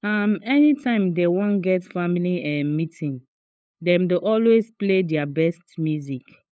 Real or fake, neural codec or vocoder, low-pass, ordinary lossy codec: fake; codec, 16 kHz, 8 kbps, FunCodec, trained on LibriTTS, 25 frames a second; none; none